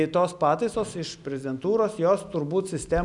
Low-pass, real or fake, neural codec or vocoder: 10.8 kHz; real; none